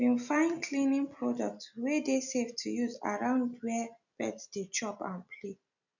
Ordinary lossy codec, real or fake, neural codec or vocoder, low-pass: none; real; none; 7.2 kHz